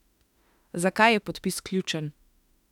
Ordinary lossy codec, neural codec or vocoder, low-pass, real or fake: none; autoencoder, 48 kHz, 32 numbers a frame, DAC-VAE, trained on Japanese speech; 19.8 kHz; fake